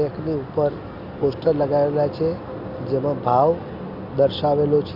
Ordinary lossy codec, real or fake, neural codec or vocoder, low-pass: Opus, 64 kbps; real; none; 5.4 kHz